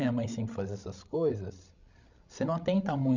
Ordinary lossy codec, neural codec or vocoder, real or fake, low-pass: none; codec, 16 kHz, 16 kbps, FreqCodec, larger model; fake; 7.2 kHz